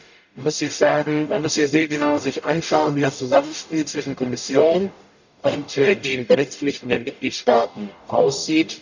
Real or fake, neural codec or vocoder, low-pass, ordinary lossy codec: fake; codec, 44.1 kHz, 0.9 kbps, DAC; 7.2 kHz; none